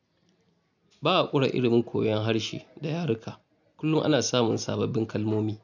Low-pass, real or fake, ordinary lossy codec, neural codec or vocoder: 7.2 kHz; real; none; none